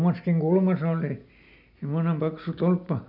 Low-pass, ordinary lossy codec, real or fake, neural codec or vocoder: 5.4 kHz; none; real; none